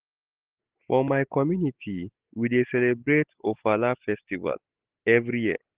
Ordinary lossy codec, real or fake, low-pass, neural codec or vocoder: Opus, 16 kbps; real; 3.6 kHz; none